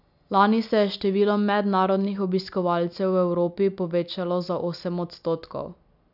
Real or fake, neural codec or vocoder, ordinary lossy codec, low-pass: real; none; none; 5.4 kHz